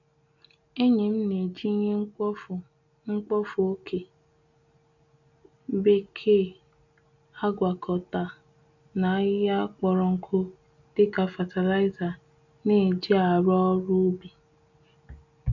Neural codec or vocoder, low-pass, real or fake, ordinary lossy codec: none; 7.2 kHz; real; none